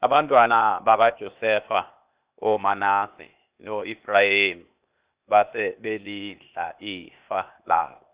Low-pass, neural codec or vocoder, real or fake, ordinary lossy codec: 3.6 kHz; codec, 16 kHz, 0.8 kbps, ZipCodec; fake; Opus, 64 kbps